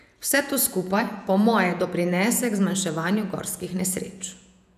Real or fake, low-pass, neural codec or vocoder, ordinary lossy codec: fake; 14.4 kHz; vocoder, 48 kHz, 128 mel bands, Vocos; none